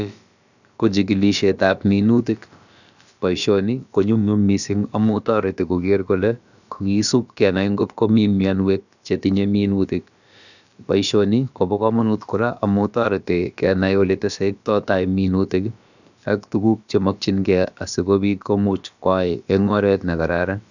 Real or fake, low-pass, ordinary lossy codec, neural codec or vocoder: fake; 7.2 kHz; none; codec, 16 kHz, about 1 kbps, DyCAST, with the encoder's durations